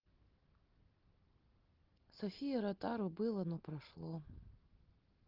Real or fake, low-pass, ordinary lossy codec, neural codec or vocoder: real; 5.4 kHz; Opus, 16 kbps; none